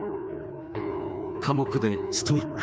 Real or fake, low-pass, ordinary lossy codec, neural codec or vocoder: fake; none; none; codec, 16 kHz, 2 kbps, FreqCodec, larger model